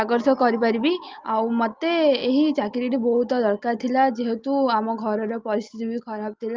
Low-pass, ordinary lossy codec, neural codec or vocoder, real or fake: 7.2 kHz; Opus, 32 kbps; none; real